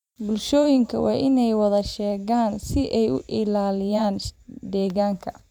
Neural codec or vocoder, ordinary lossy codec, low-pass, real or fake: vocoder, 44.1 kHz, 128 mel bands every 512 samples, BigVGAN v2; none; 19.8 kHz; fake